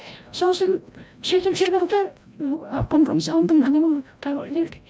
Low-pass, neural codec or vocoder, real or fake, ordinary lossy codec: none; codec, 16 kHz, 0.5 kbps, FreqCodec, larger model; fake; none